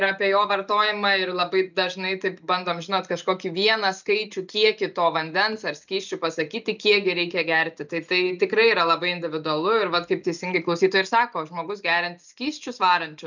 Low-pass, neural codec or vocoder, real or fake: 7.2 kHz; none; real